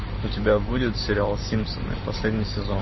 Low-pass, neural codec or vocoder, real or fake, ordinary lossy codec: 7.2 kHz; vocoder, 44.1 kHz, 128 mel bands every 512 samples, BigVGAN v2; fake; MP3, 24 kbps